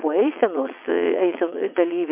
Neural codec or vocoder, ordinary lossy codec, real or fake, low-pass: vocoder, 22.05 kHz, 80 mel bands, WaveNeXt; MP3, 32 kbps; fake; 3.6 kHz